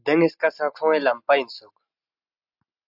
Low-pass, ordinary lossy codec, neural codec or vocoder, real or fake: 5.4 kHz; AAC, 48 kbps; none; real